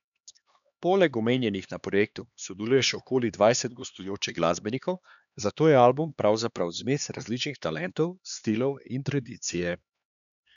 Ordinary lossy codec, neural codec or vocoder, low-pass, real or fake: none; codec, 16 kHz, 2 kbps, X-Codec, HuBERT features, trained on LibriSpeech; 7.2 kHz; fake